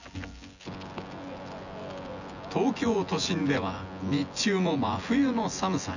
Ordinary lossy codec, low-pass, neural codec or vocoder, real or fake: none; 7.2 kHz; vocoder, 24 kHz, 100 mel bands, Vocos; fake